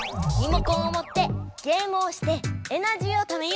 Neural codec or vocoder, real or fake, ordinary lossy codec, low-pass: none; real; none; none